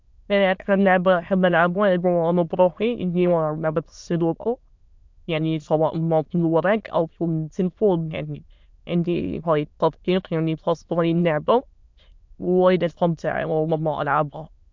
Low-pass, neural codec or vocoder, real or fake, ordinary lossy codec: 7.2 kHz; autoencoder, 22.05 kHz, a latent of 192 numbers a frame, VITS, trained on many speakers; fake; MP3, 64 kbps